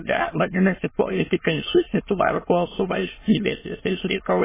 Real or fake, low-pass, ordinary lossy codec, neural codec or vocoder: fake; 3.6 kHz; MP3, 16 kbps; autoencoder, 22.05 kHz, a latent of 192 numbers a frame, VITS, trained on many speakers